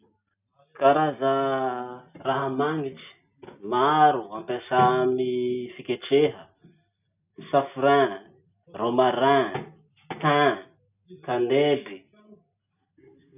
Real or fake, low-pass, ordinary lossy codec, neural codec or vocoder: real; 3.6 kHz; none; none